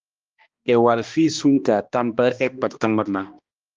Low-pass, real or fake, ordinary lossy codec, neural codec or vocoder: 7.2 kHz; fake; Opus, 32 kbps; codec, 16 kHz, 1 kbps, X-Codec, HuBERT features, trained on balanced general audio